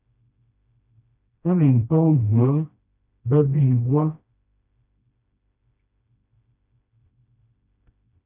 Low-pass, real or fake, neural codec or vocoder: 3.6 kHz; fake; codec, 16 kHz, 1 kbps, FreqCodec, smaller model